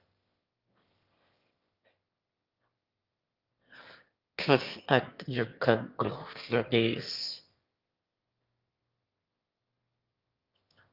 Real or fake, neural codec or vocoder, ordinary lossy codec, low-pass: fake; autoencoder, 22.05 kHz, a latent of 192 numbers a frame, VITS, trained on one speaker; Opus, 24 kbps; 5.4 kHz